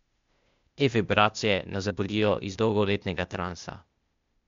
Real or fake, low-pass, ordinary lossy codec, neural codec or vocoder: fake; 7.2 kHz; MP3, 64 kbps; codec, 16 kHz, 0.8 kbps, ZipCodec